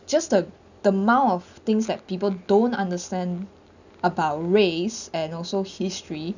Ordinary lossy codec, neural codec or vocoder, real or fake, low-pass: none; none; real; 7.2 kHz